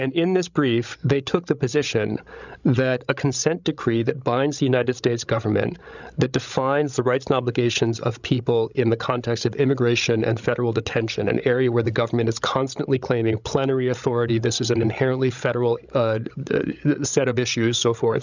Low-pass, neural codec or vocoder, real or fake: 7.2 kHz; codec, 16 kHz, 16 kbps, FreqCodec, larger model; fake